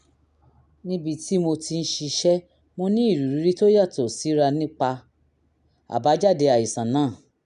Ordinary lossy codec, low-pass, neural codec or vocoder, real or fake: MP3, 96 kbps; 10.8 kHz; none; real